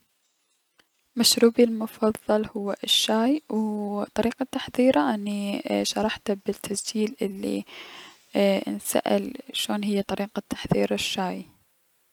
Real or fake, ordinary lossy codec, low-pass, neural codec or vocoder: real; none; 19.8 kHz; none